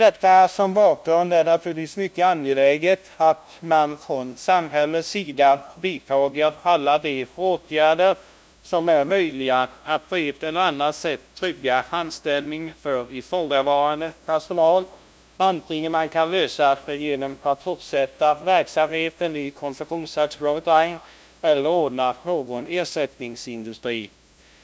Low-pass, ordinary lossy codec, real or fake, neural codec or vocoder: none; none; fake; codec, 16 kHz, 0.5 kbps, FunCodec, trained on LibriTTS, 25 frames a second